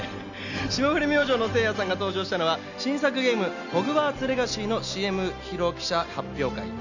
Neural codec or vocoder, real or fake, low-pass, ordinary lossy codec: none; real; 7.2 kHz; none